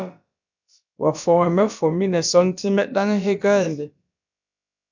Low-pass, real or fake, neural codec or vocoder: 7.2 kHz; fake; codec, 16 kHz, about 1 kbps, DyCAST, with the encoder's durations